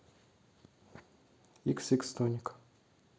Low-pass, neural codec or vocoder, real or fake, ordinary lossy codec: none; none; real; none